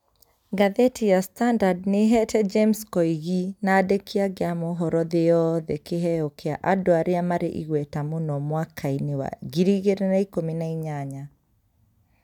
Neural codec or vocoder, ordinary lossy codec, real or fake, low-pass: none; none; real; 19.8 kHz